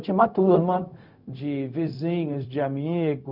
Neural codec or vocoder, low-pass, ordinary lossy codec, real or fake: codec, 16 kHz, 0.4 kbps, LongCat-Audio-Codec; 5.4 kHz; none; fake